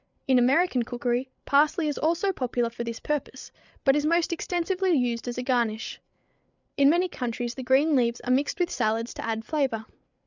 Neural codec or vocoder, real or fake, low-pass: codec, 16 kHz, 8 kbps, FreqCodec, larger model; fake; 7.2 kHz